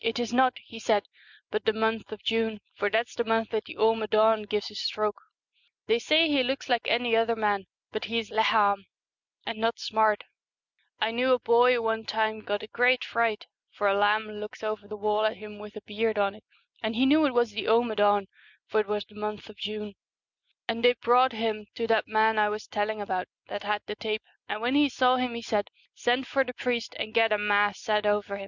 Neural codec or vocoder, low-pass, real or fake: none; 7.2 kHz; real